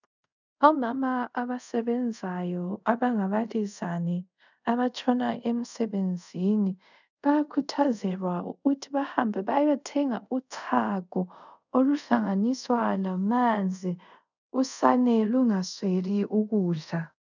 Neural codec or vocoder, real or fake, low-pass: codec, 24 kHz, 0.5 kbps, DualCodec; fake; 7.2 kHz